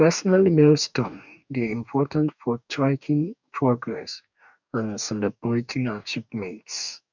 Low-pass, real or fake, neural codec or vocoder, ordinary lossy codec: 7.2 kHz; fake; codec, 44.1 kHz, 2.6 kbps, DAC; none